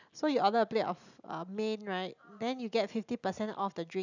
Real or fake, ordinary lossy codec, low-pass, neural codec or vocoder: real; none; 7.2 kHz; none